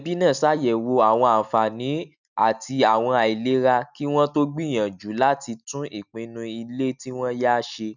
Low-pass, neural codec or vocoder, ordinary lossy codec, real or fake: 7.2 kHz; none; none; real